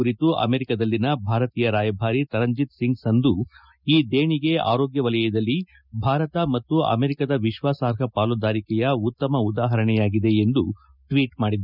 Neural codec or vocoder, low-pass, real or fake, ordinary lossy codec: none; 5.4 kHz; real; none